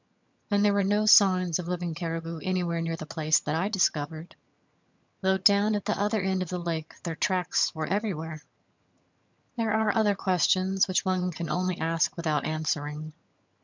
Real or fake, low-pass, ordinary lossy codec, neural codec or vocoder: fake; 7.2 kHz; MP3, 64 kbps; vocoder, 22.05 kHz, 80 mel bands, HiFi-GAN